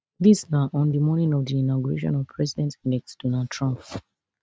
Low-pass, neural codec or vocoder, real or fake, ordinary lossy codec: none; none; real; none